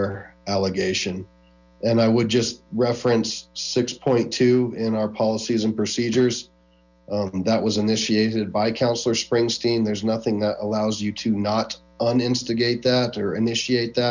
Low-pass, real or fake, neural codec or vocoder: 7.2 kHz; real; none